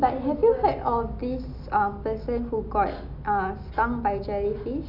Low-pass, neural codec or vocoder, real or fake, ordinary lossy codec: 5.4 kHz; none; real; none